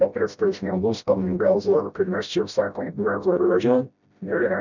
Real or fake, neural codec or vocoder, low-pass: fake; codec, 16 kHz, 0.5 kbps, FreqCodec, smaller model; 7.2 kHz